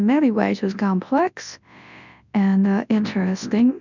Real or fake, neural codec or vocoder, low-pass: fake; codec, 24 kHz, 0.9 kbps, WavTokenizer, large speech release; 7.2 kHz